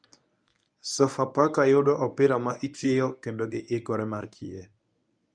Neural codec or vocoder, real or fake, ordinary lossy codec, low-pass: codec, 24 kHz, 0.9 kbps, WavTokenizer, medium speech release version 1; fake; none; 9.9 kHz